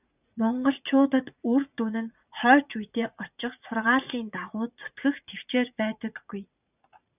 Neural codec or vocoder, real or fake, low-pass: vocoder, 44.1 kHz, 80 mel bands, Vocos; fake; 3.6 kHz